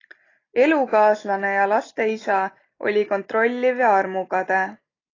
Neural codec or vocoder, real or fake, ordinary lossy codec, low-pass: none; real; AAC, 32 kbps; 7.2 kHz